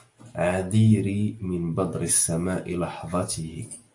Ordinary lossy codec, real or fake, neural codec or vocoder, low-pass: AAC, 64 kbps; real; none; 10.8 kHz